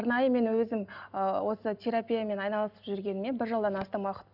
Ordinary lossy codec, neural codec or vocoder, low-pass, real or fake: none; none; 5.4 kHz; real